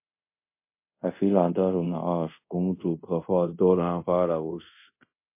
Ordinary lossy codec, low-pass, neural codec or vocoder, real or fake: MP3, 24 kbps; 3.6 kHz; codec, 24 kHz, 0.5 kbps, DualCodec; fake